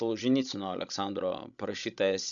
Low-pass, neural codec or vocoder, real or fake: 7.2 kHz; codec, 16 kHz, 16 kbps, FreqCodec, larger model; fake